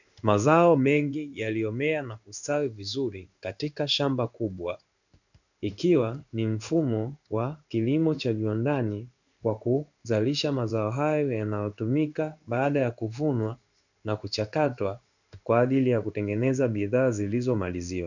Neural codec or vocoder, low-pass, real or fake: codec, 16 kHz in and 24 kHz out, 1 kbps, XY-Tokenizer; 7.2 kHz; fake